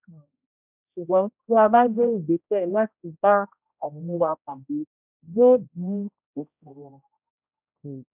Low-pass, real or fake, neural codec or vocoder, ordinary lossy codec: 3.6 kHz; fake; codec, 16 kHz, 0.5 kbps, X-Codec, HuBERT features, trained on general audio; none